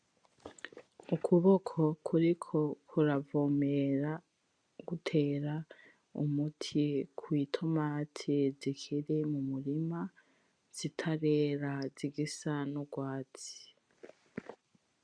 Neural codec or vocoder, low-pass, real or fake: none; 9.9 kHz; real